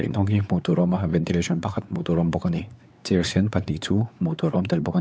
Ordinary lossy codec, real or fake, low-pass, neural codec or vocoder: none; fake; none; codec, 16 kHz, 4 kbps, X-Codec, WavLM features, trained on Multilingual LibriSpeech